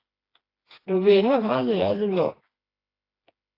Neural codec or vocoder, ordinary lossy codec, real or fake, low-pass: codec, 16 kHz, 2 kbps, FreqCodec, smaller model; AAC, 24 kbps; fake; 5.4 kHz